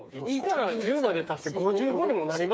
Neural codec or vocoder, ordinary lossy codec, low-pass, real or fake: codec, 16 kHz, 4 kbps, FreqCodec, smaller model; none; none; fake